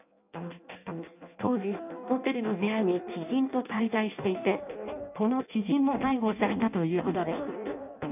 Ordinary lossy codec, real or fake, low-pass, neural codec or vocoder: none; fake; 3.6 kHz; codec, 16 kHz in and 24 kHz out, 0.6 kbps, FireRedTTS-2 codec